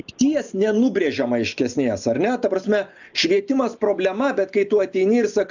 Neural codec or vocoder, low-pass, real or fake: none; 7.2 kHz; real